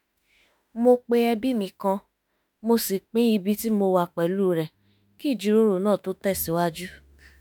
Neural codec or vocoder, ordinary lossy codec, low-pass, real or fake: autoencoder, 48 kHz, 32 numbers a frame, DAC-VAE, trained on Japanese speech; none; none; fake